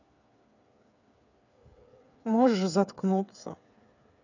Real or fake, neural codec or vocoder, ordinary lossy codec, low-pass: fake; codec, 16 kHz, 8 kbps, FreqCodec, smaller model; none; 7.2 kHz